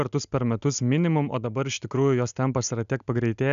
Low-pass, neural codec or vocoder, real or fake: 7.2 kHz; none; real